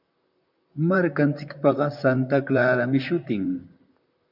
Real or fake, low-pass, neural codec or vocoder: fake; 5.4 kHz; vocoder, 44.1 kHz, 128 mel bands, Pupu-Vocoder